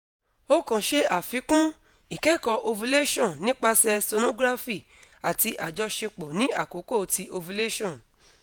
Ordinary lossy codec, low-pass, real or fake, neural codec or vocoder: none; none; fake; vocoder, 48 kHz, 128 mel bands, Vocos